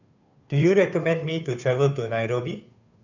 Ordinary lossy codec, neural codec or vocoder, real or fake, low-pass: none; codec, 16 kHz, 2 kbps, FunCodec, trained on Chinese and English, 25 frames a second; fake; 7.2 kHz